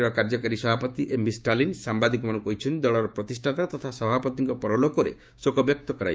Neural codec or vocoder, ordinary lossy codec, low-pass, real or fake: codec, 16 kHz, 6 kbps, DAC; none; none; fake